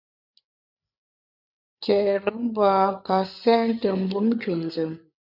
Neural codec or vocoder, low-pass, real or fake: codec, 16 kHz, 8 kbps, FreqCodec, larger model; 5.4 kHz; fake